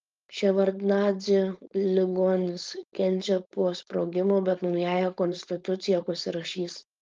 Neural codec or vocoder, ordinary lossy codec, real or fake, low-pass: codec, 16 kHz, 4.8 kbps, FACodec; Opus, 32 kbps; fake; 7.2 kHz